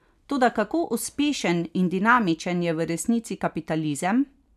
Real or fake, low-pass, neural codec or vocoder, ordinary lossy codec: real; 14.4 kHz; none; none